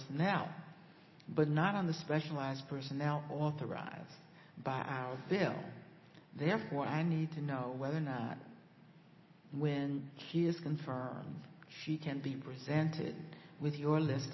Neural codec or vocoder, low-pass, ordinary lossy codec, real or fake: none; 7.2 kHz; MP3, 24 kbps; real